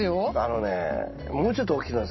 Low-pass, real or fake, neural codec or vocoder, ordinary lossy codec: 7.2 kHz; real; none; MP3, 24 kbps